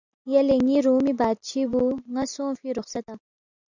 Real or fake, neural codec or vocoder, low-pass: real; none; 7.2 kHz